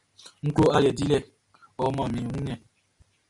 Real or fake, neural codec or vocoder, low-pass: real; none; 10.8 kHz